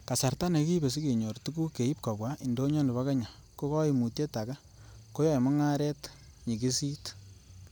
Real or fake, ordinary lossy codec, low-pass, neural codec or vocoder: real; none; none; none